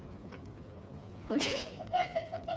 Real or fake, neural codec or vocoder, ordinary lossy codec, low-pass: fake; codec, 16 kHz, 4 kbps, FreqCodec, smaller model; none; none